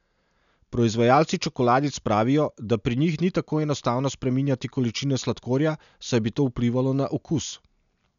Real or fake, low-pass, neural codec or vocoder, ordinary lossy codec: real; 7.2 kHz; none; none